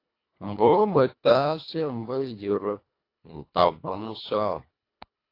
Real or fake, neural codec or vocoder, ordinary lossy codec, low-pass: fake; codec, 24 kHz, 1.5 kbps, HILCodec; AAC, 32 kbps; 5.4 kHz